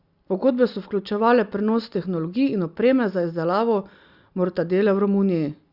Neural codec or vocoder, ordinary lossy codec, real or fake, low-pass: none; Opus, 64 kbps; real; 5.4 kHz